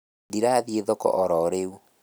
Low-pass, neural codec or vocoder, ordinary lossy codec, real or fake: none; none; none; real